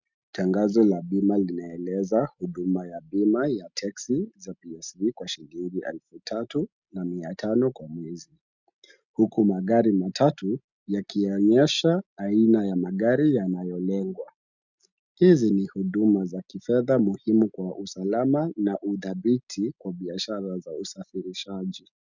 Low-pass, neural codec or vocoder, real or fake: 7.2 kHz; none; real